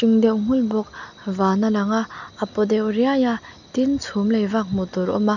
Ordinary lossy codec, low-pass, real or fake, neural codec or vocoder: none; 7.2 kHz; real; none